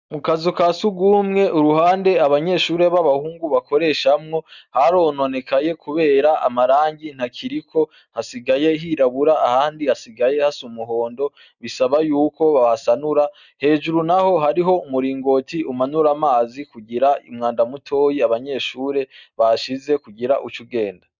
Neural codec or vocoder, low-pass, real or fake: none; 7.2 kHz; real